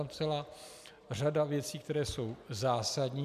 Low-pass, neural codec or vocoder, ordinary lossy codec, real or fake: 14.4 kHz; none; MP3, 96 kbps; real